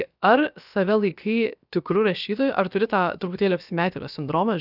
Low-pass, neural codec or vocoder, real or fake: 5.4 kHz; codec, 16 kHz, about 1 kbps, DyCAST, with the encoder's durations; fake